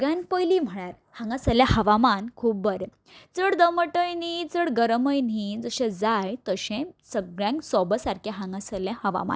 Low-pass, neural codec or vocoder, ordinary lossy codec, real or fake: none; none; none; real